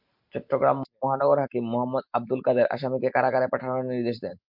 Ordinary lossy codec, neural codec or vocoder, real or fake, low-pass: MP3, 48 kbps; none; real; 5.4 kHz